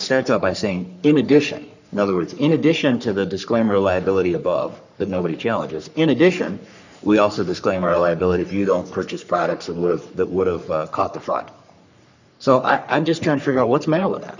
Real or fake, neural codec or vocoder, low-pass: fake; codec, 44.1 kHz, 3.4 kbps, Pupu-Codec; 7.2 kHz